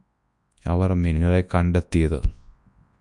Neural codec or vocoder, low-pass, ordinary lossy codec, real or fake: codec, 24 kHz, 0.9 kbps, WavTokenizer, large speech release; 10.8 kHz; Opus, 64 kbps; fake